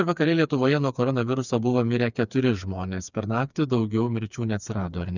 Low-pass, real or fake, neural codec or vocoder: 7.2 kHz; fake; codec, 16 kHz, 4 kbps, FreqCodec, smaller model